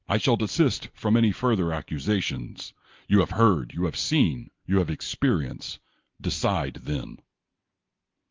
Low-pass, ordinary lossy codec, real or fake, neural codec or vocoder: 7.2 kHz; Opus, 24 kbps; real; none